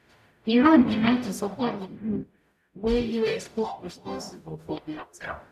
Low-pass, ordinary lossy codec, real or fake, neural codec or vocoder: 14.4 kHz; none; fake; codec, 44.1 kHz, 0.9 kbps, DAC